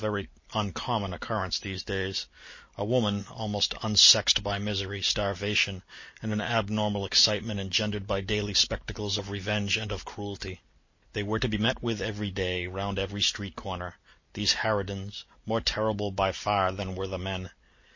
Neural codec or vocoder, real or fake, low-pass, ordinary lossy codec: none; real; 7.2 kHz; MP3, 32 kbps